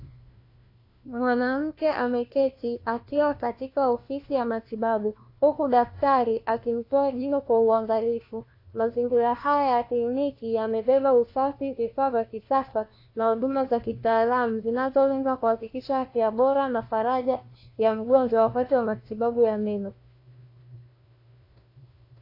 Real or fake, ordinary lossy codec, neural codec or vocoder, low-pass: fake; AAC, 32 kbps; codec, 16 kHz, 1 kbps, FunCodec, trained on LibriTTS, 50 frames a second; 5.4 kHz